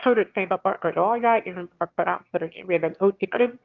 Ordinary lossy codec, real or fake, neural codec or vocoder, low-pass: Opus, 24 kbps; fake; autoencoder, 22.05 kHz, a latent of 192 numbers a frame, VITS, trained on one speaker; 7.2 kHz